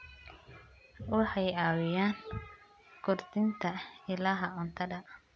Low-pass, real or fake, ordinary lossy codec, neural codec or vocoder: none; real; none; none